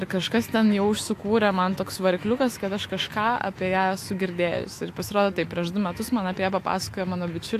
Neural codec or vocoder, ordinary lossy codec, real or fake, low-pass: autoencoder, 48 kHz, 128 numbers a frame, DAC-VAE, trained on Japanese speech; AAC, 64 kbps; fake; 14.4 kHz